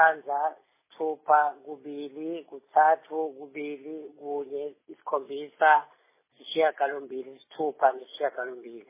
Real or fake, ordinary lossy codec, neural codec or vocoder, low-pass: real; MP3, 16 kbps; none; 3.6 kHz